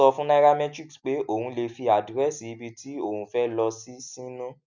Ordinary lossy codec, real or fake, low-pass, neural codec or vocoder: none; real; 7.2 kHz; none